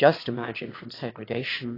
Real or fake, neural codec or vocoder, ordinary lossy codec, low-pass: fake; autoencoder, 22.05 kHz, a latent of 192 numbers a frame, VITS, trained on one speaker; AAC, 24 kbps; 5.4 kHz